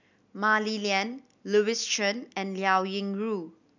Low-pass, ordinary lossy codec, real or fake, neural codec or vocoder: 7.2 kHz; none; real; none